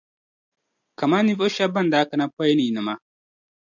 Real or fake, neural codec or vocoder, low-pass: real; none; 7.2 kHz